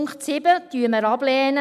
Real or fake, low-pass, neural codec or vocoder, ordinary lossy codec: real; 14.4 kHz; none; none